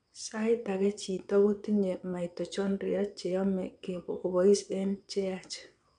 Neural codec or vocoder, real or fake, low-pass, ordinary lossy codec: vocoder, 22.05 kHz, 80 mel bands, WaveNeXt; fake; 9.9 kHz; none